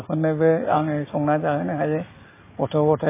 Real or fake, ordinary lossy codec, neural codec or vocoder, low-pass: real; MP3, 16 kbps; none; 3.6 kHz